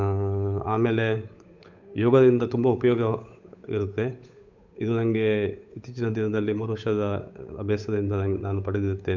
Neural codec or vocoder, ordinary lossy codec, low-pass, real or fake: codec, 16 kHz, 8 kbps, FunCodec, trained on Chinese and English, 25 frames a second; none; 7.2 kHz; fake